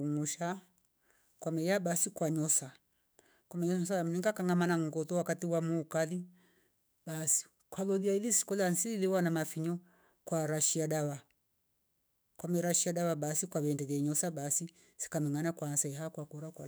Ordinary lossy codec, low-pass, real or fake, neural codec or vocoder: none; none; fake; autoencoder, 48 kHz, 128 numbers a frame, DAC-VAE, trained on Japanese speech